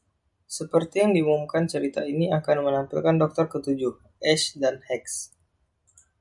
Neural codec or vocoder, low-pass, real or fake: none; 10.8 kHz; real